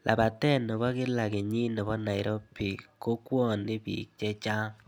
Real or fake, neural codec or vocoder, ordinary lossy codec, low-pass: real; none; none; none